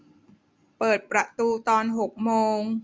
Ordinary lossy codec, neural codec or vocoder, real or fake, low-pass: none; none; real; none